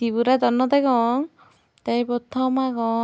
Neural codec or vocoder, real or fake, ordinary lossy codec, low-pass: none; real; none; none